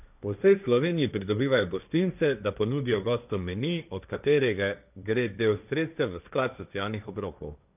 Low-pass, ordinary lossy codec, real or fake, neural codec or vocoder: 3.6 kHz; none; fake; codec, 16 kHz, 1.1 kbps, Voila-Tokenizer